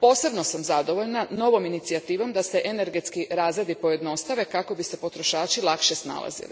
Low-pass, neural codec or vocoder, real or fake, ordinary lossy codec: none; none; real; none